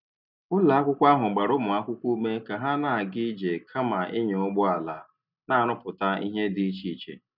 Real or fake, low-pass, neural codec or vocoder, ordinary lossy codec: real; 5.4 kHz; none; none